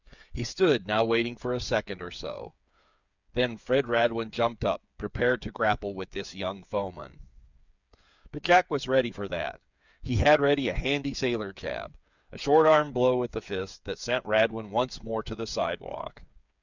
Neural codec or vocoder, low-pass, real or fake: codec, 16 kHz, 8 kbps, FreqCodec, smaller model; 7.2 kHz; fake